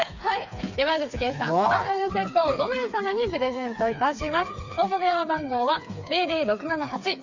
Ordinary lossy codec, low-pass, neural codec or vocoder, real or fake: MP3, 64 kbps; 7.2 kHz; codec, 16 kHz, 4 kbps, FreqCodec, smaller model; fake